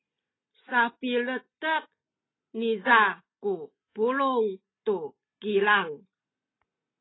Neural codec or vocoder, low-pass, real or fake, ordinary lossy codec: none; 7.2 kHz; real; AAC, 16 kbps